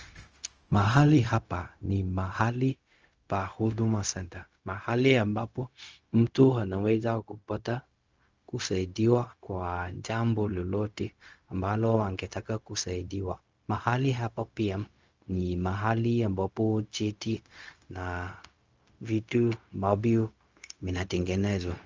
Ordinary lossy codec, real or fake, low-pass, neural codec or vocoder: Opus, 24 kbps; fake; 7.2 kHz; codec, 16 kHz, 0.4 kbps, LongCat-Audio-Codec